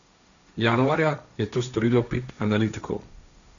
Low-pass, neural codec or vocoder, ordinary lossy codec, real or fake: 7.2 kHz; codec, 16 kHz, 1.1 kbps, Voila-Tokenizer; MP3, 96 kbps; fake